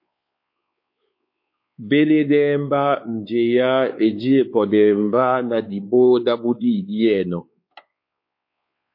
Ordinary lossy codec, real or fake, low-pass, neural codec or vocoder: MP3, 32 kbps; fake; 5.4 kHz; codec, 16 kHz, 4 kbps, X-Codec, WavLM features, trained on Multilingual LibriSpeech